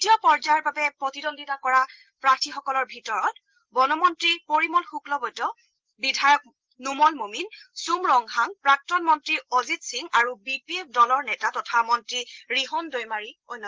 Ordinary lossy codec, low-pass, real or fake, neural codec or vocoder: Opus, 16 kbps; 7.2 kHz; real; none